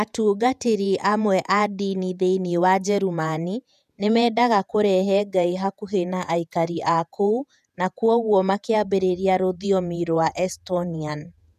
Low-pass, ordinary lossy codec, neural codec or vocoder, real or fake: 14.4 kHz; none; vocoder, 48 kHz, 128 mel bands, Vocos; fake